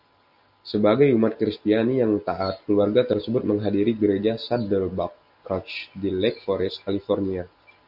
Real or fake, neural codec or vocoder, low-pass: real; none; 5.4 kHz